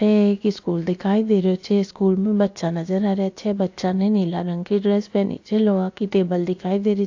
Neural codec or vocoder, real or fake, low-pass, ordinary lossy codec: codec, 16 kHz, about 1 kbps, DyCAST, with the encoder's durations; fake; 7.2 kHz; AAC, 48 kbps